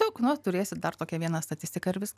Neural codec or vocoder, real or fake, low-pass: none; real; 14.4 kHz